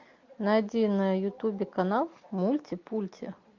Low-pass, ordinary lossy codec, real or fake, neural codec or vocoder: 7.2 kHz; MP3, 48 kbps; real; none